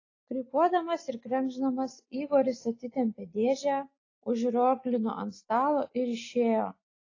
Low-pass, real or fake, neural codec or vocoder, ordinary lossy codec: 7.2 kHz; real; none; AAC, 32 kbps